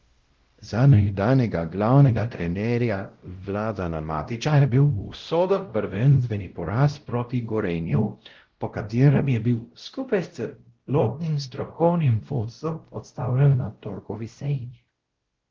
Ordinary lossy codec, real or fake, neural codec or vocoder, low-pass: Opus, 16 kbps; fake; codec, 16 kHz, 0.5 kbps, X-Codec, WavLM features, trained on Multilingual LibriSpeech; 7.2 kHz